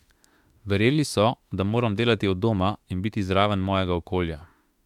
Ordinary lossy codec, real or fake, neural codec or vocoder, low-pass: MP3, 96 kbps; fake; autoencoder, 48 kHz, 32 numbers a frame, DAC-VAE, trained on Japanese speech; 19.8 kHz